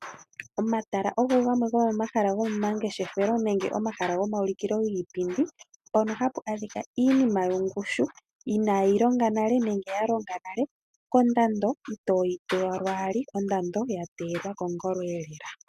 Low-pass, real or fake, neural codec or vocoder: 14.4 kHz; real; none